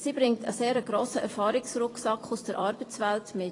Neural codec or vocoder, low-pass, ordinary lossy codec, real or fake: vocoder, 44.1 kHz, 128 mel bands every 256 samples, BigVGAN v2; 10.8 kHz; AAC, 32 kbps; fake